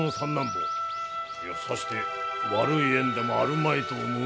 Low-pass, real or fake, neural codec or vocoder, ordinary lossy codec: none; real; none; none